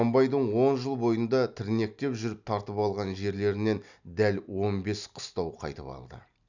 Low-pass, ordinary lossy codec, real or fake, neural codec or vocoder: 7.2 kHz; none; real; none